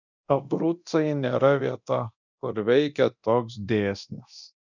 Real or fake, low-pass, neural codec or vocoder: fake; 7.2 kHz; codec, 24 kHz, 0.9 kbps, DualCodec